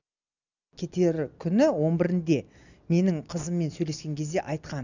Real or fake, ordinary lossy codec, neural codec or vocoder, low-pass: real; none; none; 7.2 kHz